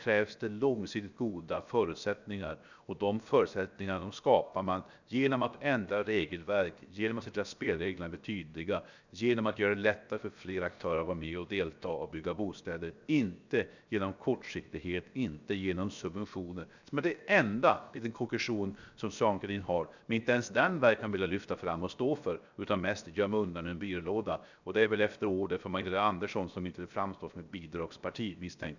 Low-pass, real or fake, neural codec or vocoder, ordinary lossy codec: 7.2 kHz; fake; codec, 16 kHz, 0.7 kbps, FocalCodec; none